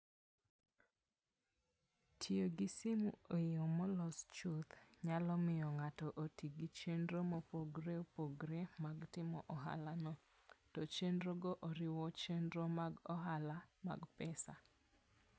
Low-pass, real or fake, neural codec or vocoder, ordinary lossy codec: none; real; none; none